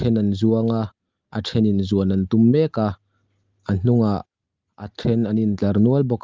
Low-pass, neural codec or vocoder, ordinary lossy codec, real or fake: 7.2 kHz; none; Opus, 32 kbps; real